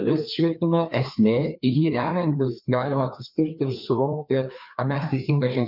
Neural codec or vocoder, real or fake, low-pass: codec, 16 kHz, 2 kbps, FreqCodec, larger model; fake; 5.4 kHz